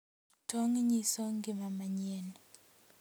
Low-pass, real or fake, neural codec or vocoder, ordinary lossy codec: none; real; none; none